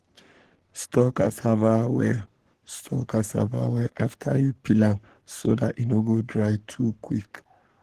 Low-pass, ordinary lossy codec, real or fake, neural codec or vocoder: 14.4 kHz; Opus, 16 kbps; fake; codec, 44.1 kHz, 3.4 kbps, Pupu-Codec